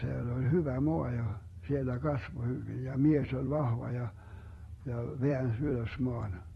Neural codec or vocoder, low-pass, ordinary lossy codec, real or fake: vocoder, 44.1 kHz, 128 mel bands every 256 samples, BigVGAN v2; 19.8 kHz; AAC, 32 kbps; fake